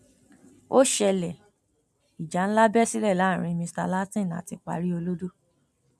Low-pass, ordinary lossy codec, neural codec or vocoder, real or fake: none; none; none; real